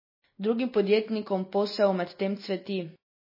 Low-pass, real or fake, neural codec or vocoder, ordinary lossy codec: 5.4 kHz; real; none; MP3, 24 kbps